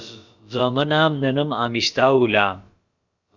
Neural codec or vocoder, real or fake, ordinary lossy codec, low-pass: codec, 16 kHz, about 1 kbps, DyCAST, with the encoder's durations; fake; Opus, 64 kbps; 7.2 kHz